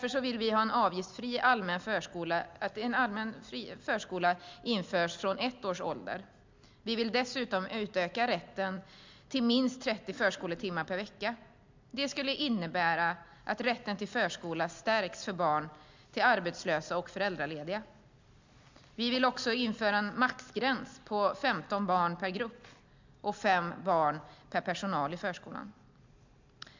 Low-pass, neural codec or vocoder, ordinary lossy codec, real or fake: 7.2 kHz; none; MP3, 64 kbps; real